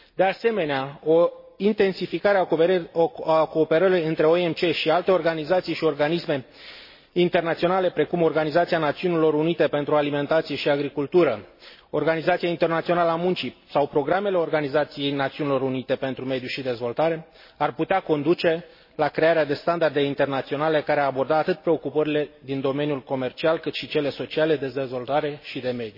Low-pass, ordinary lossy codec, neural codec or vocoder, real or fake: 5.4 kHz; MP3, 24 kbps; none; real